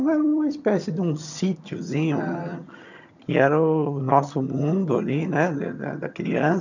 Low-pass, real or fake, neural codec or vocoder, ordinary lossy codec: 7.2 kHz; fake; vocoder, 22.05 kHz, 80 mel bands, HiFi-GAN; none